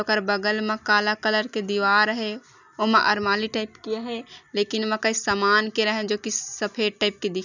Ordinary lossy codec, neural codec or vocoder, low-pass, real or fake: none; none; 7.2 kHz; real